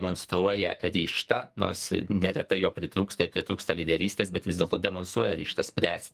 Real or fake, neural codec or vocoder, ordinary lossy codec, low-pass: fake; codec, 44.1 kHz, 2.6 kbps, SNAC; Opus, 32 kbps; 14.4 kHz